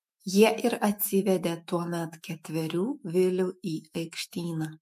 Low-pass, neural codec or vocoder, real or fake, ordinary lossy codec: 14.4 kHz; autoencoder, 48 kHz, 128 numbers a frame, DAC-VAE, trained on Japanese speech; fake; MP3, 64 kbps